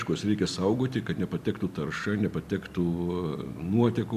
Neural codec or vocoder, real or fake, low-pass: none; real; 14.4 kHz